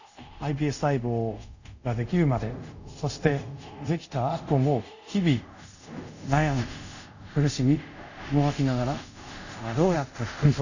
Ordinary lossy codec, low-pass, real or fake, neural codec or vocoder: none; 7.2 kHz; fake; codec, 24 kHz, 0.5 kbps, DualCodec